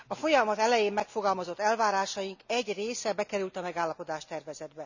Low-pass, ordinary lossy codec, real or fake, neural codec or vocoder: 7.2 kHz; none; real; none